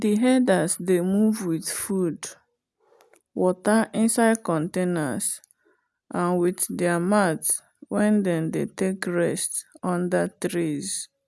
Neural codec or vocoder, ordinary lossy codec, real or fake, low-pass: none; none; real; none